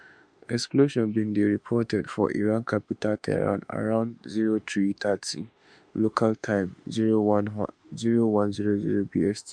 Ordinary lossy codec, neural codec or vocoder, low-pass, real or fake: none; autoencoder, 48 kHz, 32 numbers a frame, DAC-VAE, trained on Japanese speech; 9.9 kHz; fake